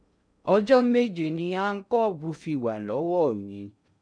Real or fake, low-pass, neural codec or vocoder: fake; 9.9 kHz; codec, 16 kHz in and 24 kHz out, 0.6 kbps, FocalCodec, streaming, 2048 codes